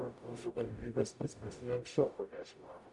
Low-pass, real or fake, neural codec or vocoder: 10.8 kHz; fake; codec, 44.1 kHz, 0.9 kbps, DAC